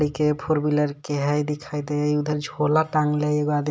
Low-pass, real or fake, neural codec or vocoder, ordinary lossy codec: none; real; none; none